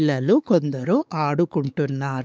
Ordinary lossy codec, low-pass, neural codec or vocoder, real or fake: none; none; codec, 16 kHz, 4 kbps, X-Codec, WavLM features, trained on Multilingual LibriSpeech; fake